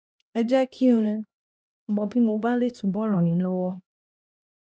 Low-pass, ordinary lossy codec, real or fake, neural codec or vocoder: none; none; fake; codec, 16 kHz, 1 kbps, X-Codec, HuBERT features, trained on LibriSpeech